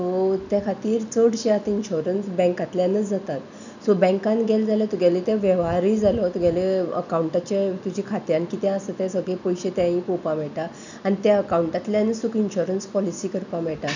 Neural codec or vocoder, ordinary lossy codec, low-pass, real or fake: none; none; 7.2 kHz; real